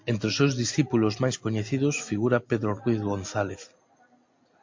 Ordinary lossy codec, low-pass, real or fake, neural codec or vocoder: MP3, 48 kbps; 7.2 kHz; real; none